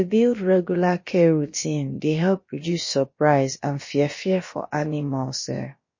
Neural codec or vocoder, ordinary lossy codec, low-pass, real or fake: codec, 16 kHz, about 1 kbps, DyCAST, with the encoder's durations; MP3, 32 kbps; 7.2 kHz; fake